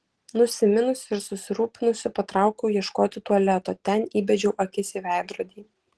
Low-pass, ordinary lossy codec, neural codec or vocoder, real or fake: 10.8 kHz; Opus, 16 kbps; none; real